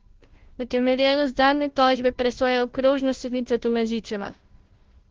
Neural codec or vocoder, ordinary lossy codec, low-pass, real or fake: codec, 16 kHz, 0.5 kbps, FunCodec, trained on Chinese and English, 25 frames a second; Opus, 16 kbps; 7.2 kHz; fake